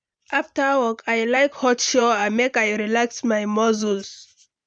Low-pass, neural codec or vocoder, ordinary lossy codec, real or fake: 9.9 kHz; vocoder, 44.1 kHz, 128 mel bands every 512 samples, BigVGAN v2; none; fake